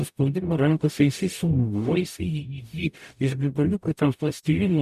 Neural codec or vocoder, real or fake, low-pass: codec, 44.1 kHz, 0.9 kbps, DAC; fake; 14.4 kHz